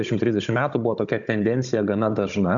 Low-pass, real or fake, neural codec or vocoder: 7.2 kHz; fake; codec, 16 kHz, 16 kbps, FunCodec, trained on Chinese and English, 50 frames a second